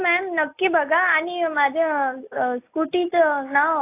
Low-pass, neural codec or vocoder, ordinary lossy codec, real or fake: 3.6 kHz; none; AAC, 24 kbps; real